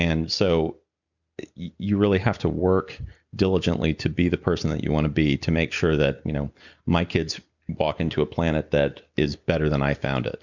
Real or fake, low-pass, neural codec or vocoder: real; 7.2 kHz; none